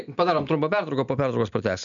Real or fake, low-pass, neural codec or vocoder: real; 7.2 kHz; none